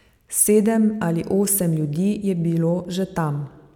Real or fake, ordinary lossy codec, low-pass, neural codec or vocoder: real; none; 19.8 kHz; none